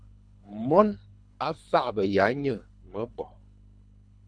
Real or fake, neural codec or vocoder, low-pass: fake; codec, 24 kHz, 3 kbps, HILCodec; 9.9 kHz